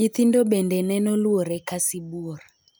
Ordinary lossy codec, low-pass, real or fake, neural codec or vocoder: none; none; real; none